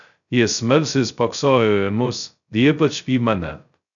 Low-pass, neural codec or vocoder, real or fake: 7.2 kHz; codec, 16 kHz, 0.2 kbps, FocalCodec; fake